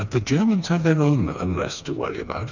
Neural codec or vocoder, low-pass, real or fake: codec, 16 kHz, 2 kbps, FreqCodec, smaller model; 7.2 kHz; fake